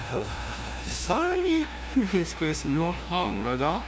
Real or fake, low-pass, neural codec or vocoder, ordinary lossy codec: fake; none; codec, 16 kHz, 0.5 kbps, FunCodec, trained on LibriTTS, 25 frames a second; none